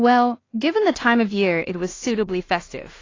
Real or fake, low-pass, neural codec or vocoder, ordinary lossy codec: fake; 7.2 kHz; codec, 16 kHz in and 24 kHz out, 0.4 kbps, LongCat-Audio-Codec, two codebook decoder; AAC, 32 kbps